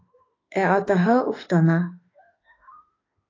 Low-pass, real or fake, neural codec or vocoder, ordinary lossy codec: 7.2 kHz; fake; autoencoder, 48 kHz, 32 numbers a frame, DAC-VAE, trained on Japanese speech; AAC, 32 kbps